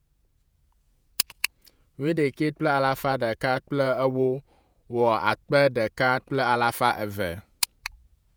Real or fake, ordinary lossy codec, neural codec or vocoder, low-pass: fake; none; vocoder, 48 kHz, 128 mel bands, Vocos; none